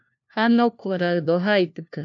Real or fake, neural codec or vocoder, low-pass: fake; codec, 16 kHz, 1 kbps, FunCodec, trained on LibriTTS, 50 frames a second; 7.2 kHz